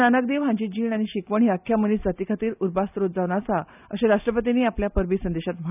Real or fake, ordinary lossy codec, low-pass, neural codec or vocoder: real; none; 3.6 kHz; none